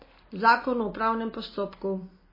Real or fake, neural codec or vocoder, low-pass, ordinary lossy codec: real; none; 5.4 kHz; MP3, 24 kbps